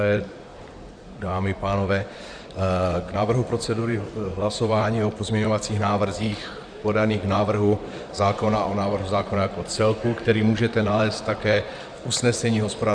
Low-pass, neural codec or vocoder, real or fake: 9.9 kHz; vocoder, 44.1 kHz, 128 mel bands, Pupu-Vocoder; fake